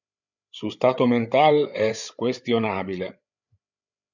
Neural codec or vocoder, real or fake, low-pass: codec, 16 kHz, 8 kbps, FreqCodec, larger model; fake; 7.2 kHz